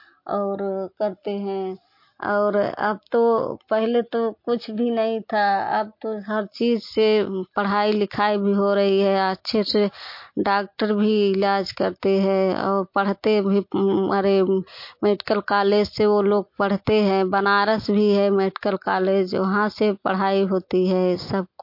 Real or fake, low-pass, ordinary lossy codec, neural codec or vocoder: real; 5.4 kHz; MP3, 32 kbps; none